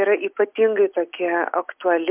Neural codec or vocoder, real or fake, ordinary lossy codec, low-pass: none; real; MP3, 32 kbps; 3.6 kHz